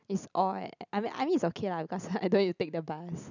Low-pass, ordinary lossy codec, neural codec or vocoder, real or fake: 7.2 kHz; none; none; real